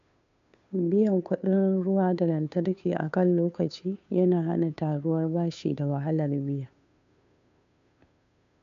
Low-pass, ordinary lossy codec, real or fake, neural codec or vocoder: 7.2 kHz; MP3, 64 kbps; fake; codec, 16 kHz, 2 kbps, FunCodec, trained on Chinese and English, 25 frames a second